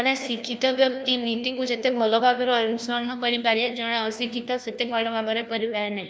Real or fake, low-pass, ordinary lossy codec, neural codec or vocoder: fake; none; none; codec, 16 kHz, 1 kbps, FunCodec, trained on LibriTTS, 50 frames a second